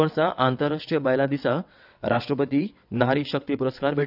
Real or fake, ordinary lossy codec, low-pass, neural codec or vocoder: fake; none; 5.4 kHz; vocoder, 22.05 kHz, 80 mel bands, WaveNeXt